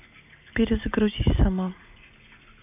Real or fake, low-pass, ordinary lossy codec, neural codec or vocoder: real; 3.6 kHz; AAC, 32 kbps; none